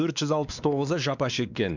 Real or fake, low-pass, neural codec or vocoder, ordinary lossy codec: fake; 7.2 kHz; codec, 16 kHz, 2 kbps, X-Codec, WavLM features, trained on Multilingual LibriSpeech; none